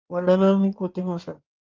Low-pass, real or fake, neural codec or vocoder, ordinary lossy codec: 7.2 kHz; fake; codec, 16 kHz in and 24 kHz out, 2.2 kbps, FireRedTTS-2 codec; Opus, 32 kbps